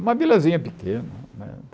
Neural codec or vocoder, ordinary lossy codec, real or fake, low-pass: none; none; real; none